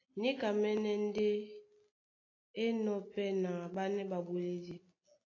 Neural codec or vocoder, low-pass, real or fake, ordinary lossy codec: none; 7.2 kHz; real; AAC, 32 kbps